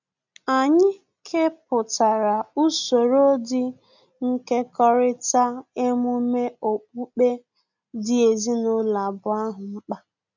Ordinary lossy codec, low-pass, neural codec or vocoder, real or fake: none; 7.2 kHz; none; real